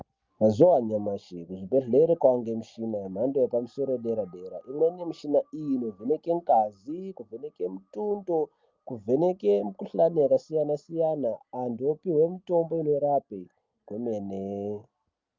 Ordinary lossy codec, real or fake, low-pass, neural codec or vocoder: Opus, 24 kbps; real; 7.2 kHz; none